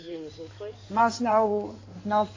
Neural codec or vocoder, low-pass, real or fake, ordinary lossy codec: codec, 16 kHz in and 24 kHz out, 2.2 kbps, FireRedTTS-2 codec; 7.2 kHz; fake; AAC, 32 kbps